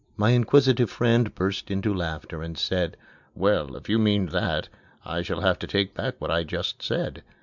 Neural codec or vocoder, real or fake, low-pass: none; real; 7.2 kHz